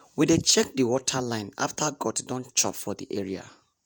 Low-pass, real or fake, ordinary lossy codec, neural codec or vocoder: none; fake; none; vocoder, 48 kHz, 128 mel bands, Vocos